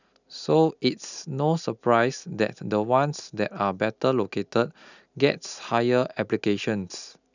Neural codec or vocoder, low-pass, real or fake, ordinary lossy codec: none; 7.2 kHz; real; none